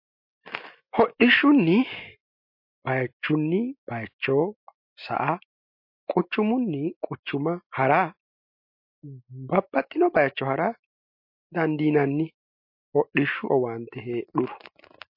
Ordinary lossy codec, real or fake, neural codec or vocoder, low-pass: MP3, 32 kbps; real; none; 5.4 kHz